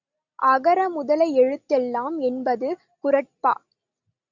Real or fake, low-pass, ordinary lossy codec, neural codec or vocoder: real; 7.2 kHz; Opus, 64 kbps; none